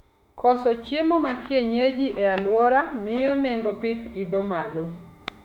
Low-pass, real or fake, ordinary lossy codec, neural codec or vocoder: 19.8 kHz; fake; none; autoencoder, 48 kHz, 32 numbers a frame, DAC-VAE, trained on Japanese speech